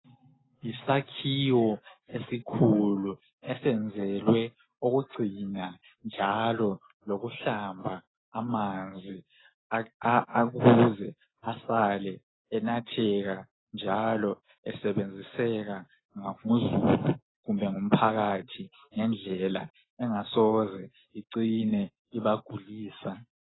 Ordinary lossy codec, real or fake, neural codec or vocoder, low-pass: AAC, 16 kbps; real; none; 7.2 kHz